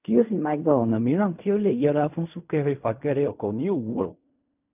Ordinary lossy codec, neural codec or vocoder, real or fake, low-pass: none; codec, 16 kHz in and 24 kHz out, 0.4 kbps, LongCat-Audio-Codec, fine tuned four codebook decoder; fake; 3.6 kHz